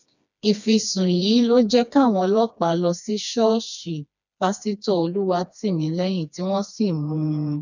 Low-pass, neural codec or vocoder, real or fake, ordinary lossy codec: 7.2 kHz; codec, 16 kHz, 2 kbps, FreqCodec, smaller model; fake; none